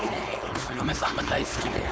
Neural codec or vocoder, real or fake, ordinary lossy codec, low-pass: codec, 16 kHz, 4.8 kbps, FACodec; fake; none; none